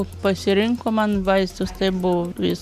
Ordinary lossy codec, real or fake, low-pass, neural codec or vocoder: MP3, 96 kbps; real; 14.4 kHz; none